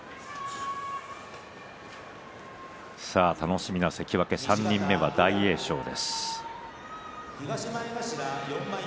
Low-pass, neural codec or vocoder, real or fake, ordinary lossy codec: none; none; real; none